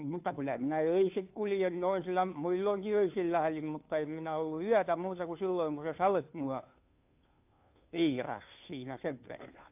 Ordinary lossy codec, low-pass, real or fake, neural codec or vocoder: AAC, 32 kbps; 3.6 kHz; fake; codec, 16 kHz, 2 kbps, FunCodec, trained on Chinese and English, 25 frames a second